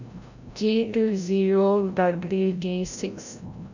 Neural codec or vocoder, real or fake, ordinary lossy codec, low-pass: codec, 16 kHz, 0.5 kbps, FreqCodec, larger model; fake; none; 7.2 kHz